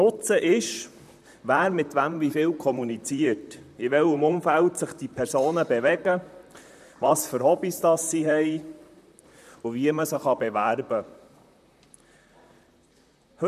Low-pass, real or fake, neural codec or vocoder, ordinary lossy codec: 14.4 kHz; fake; vocoder, 44.1 kHz, 128 mel bands, Pupu-Vocoder; none